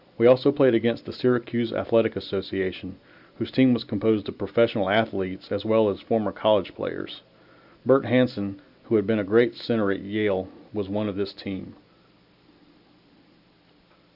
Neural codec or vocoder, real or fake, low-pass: none; real; 5.4 kHz